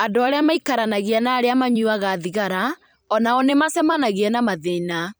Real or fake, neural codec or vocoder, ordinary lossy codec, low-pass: fake; vocoder, 44.1 kHz, 128 mel bands every 256 samples, BigVGAN v2; none; none